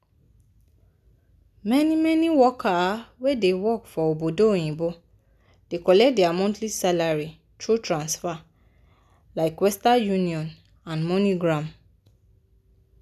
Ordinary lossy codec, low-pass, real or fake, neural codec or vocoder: none; 14.4 kHz; real; none